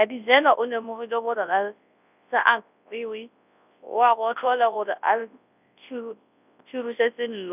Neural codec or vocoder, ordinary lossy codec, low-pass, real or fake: codec, 24 kHz, 0.9 kbps, WavTokenizer, large speech release; none; 3.6 kHz; fake